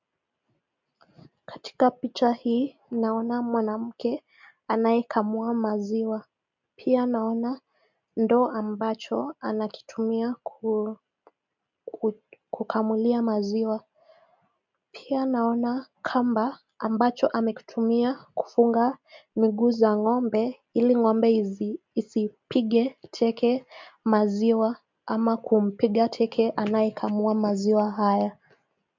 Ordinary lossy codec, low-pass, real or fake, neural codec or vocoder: MP3, 64 kbps; 7.2 kHz; real; none